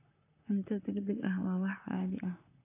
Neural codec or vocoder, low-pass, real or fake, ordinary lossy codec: vocoder, 44.1 kHz, 128 mel bands, Pupu-Vocoder; 3.6 kHz; fake; AAC, 24 kbps